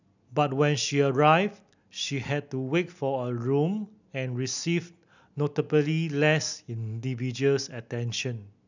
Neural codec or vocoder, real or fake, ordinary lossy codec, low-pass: none; real; none; 7.2 kHz